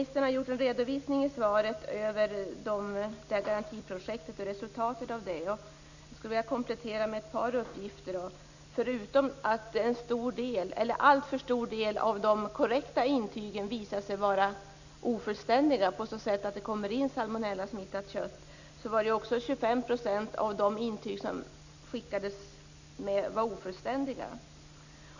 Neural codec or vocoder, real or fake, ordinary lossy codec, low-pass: none; real; none; 7.2 kHz